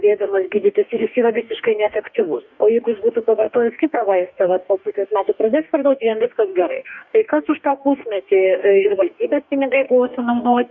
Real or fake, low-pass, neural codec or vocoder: fake; 7.2 kHz; codec, 44.1 kHz, 2.6 kbps, DAC